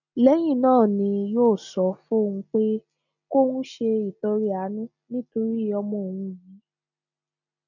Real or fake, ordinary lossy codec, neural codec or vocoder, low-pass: real; none; none; 7.2 kHz